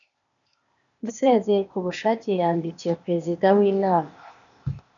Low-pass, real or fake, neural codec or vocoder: 7.2 kHz; fake; codec, 16 kHz, 0.8 kbps, ZipCodec